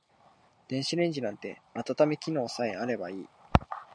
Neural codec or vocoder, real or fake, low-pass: none; real; 9.9 kHz